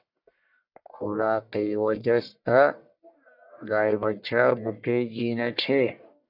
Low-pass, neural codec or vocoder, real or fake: 5.4 kHz; codec, 44.1 kHz, 1.7 kbps, Pupu-Codec; fake